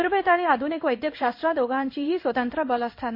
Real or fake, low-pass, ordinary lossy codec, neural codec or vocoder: fake; 5.4 kHz; MP3, 32 kbps; codec, 16 kHz in and 24 kHz out, 1 kbps, XY-Tokenizer